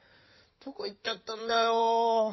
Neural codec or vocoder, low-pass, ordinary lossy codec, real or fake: codec, 16 kHz in and 24 kHz out, 2.2 kbps, FireRedTTS-2 codec; 7.2 kHz; MP3, 24 kbps; fake